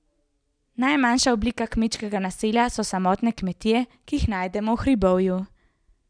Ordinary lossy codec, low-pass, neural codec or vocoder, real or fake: none; 9.9 kHz; none; real